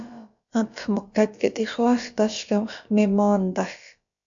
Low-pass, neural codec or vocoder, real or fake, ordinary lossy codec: 7.2 kHz; codec, 16 kHz, about 1 kbps, DyCAST, with the encoder's durations; fake; AAC, 64 kbps